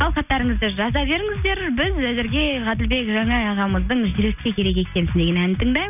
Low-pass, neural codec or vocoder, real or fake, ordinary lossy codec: 3.6 kHz; none; real; none